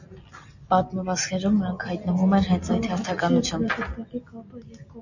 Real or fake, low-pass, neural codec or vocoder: real; 7.2 kHz; none